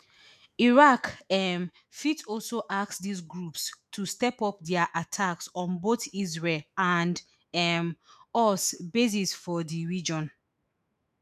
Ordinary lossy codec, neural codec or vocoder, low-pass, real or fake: none; autoencoder, 48 kHz, 128 numbers a frame, DAC-VAE, trained on Japanese speech; 14.4 kHz; fake